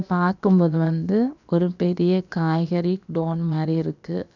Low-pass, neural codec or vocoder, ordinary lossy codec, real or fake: 7.2 kHz; codec, 16 kHz, about 1 kbps, DyCAST, with the encoder's durations; none; fake